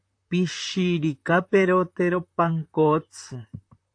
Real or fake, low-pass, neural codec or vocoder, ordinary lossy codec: fake; 9.9 kHz; vocoder, 44.1 kHz, 128 mel bands, Pupu-Vocoder; AAC, 48 kbps